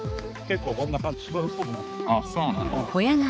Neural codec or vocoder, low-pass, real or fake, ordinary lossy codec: codec, 16 kHz, 4 kbps, X-Codec, HuBERT features, trained on balanced general audio; none; fake; none